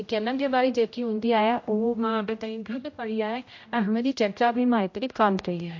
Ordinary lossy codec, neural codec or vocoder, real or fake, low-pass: MP3, 48 kbps; codec, 16 kHz, 0.5 kbps, X-Codec, HuBERT features, trained on general audio; fake; 7.2 kHz